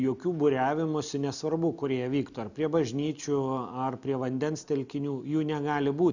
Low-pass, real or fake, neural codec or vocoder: 7.2 kHz; real; none